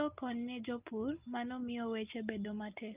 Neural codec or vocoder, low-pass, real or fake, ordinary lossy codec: none; 3.6 kHz; real; Opus, 32 kbps